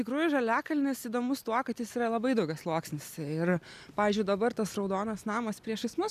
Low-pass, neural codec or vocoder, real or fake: 14.4 kHz; none; real